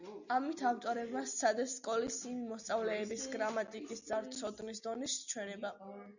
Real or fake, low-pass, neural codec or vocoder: real; 7.2 kHz; none